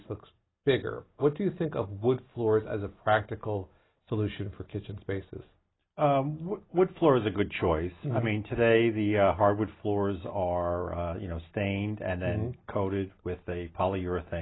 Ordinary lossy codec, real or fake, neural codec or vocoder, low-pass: AAC, 16 kbps; real; none; 7.2 kHz